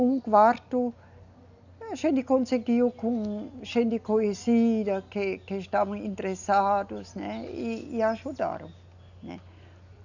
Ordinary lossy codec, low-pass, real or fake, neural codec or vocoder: none; 7.2 kHz; real; none